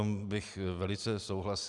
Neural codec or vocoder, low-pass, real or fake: none; 10.8 kHz; real